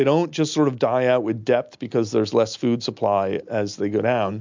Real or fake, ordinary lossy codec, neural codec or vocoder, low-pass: real; MP3, 64 kbps; none; 7.2 kHz